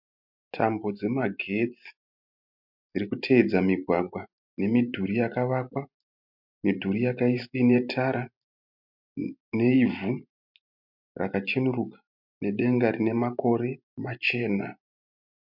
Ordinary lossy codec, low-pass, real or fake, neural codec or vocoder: MP3, 48 kbps; 5.4 kHz; fake; vocoder, 44.1 kHz, 128 mel bands every 512 samples, BigVGAN v2